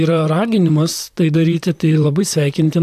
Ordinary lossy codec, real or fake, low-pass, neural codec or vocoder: AAC, 96 kbps; fake; 14.4 kHz; vocoder, 44.1 kHz, 128 mel bands, Pupu-Vocoder